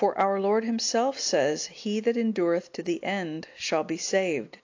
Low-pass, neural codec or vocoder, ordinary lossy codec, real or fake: 7.2 kHz; none; AAC, 48 kbps; real